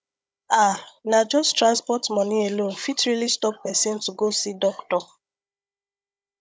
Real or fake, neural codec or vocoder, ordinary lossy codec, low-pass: fake; codec, 16 kHz, 16 kbps, FunCodec, trained on Chinese and English, 50 frames a second; none; none